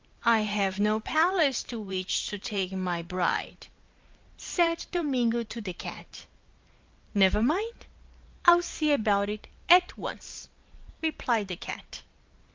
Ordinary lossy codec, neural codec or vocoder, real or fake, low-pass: Opus, 32 kbps; vocoder, 44.1 kHz, 128 mel bands every 512 samples, BigVGAN v2; fake; 7.2 kHz